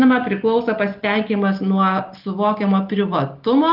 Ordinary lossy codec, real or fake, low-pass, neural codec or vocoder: Opus, 32 kbps; real; 5.4 kHz; none